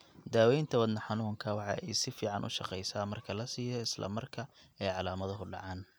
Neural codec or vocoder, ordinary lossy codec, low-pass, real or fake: none; none; none; real